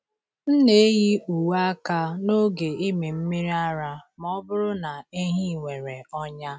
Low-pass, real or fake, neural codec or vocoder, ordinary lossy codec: none; real; none; none